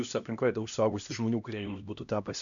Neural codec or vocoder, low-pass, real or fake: codec, 16 kHz, 1 kbps, X-Codec, HuBERT features, trained on LibriSpeech; 7.2 kHz; fake